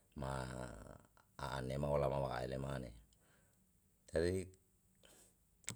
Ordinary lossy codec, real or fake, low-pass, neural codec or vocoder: none; real; none; none